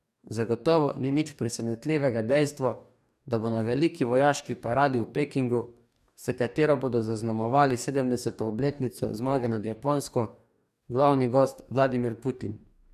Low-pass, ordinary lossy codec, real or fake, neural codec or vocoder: 14.4 kHz; none; fake; codec, 44.1 kHz, 2.6 kbps, DAC